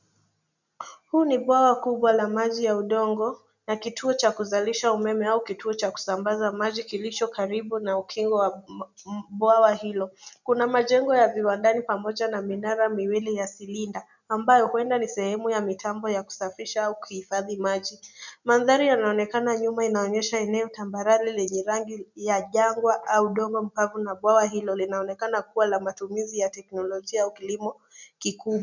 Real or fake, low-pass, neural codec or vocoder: real; 7.2 kHz; none